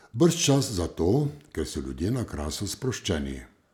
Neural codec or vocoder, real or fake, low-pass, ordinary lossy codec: none; real; 19.8 kHz; none